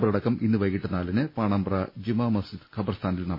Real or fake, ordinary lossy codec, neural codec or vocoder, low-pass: real; MP3, 24 kbps; none; 5.4 kHz